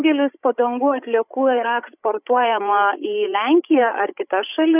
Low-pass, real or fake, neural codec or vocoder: 3.6 kHz; fake; codec, 16 kHz, 16 kbps, FreqCodec, larger model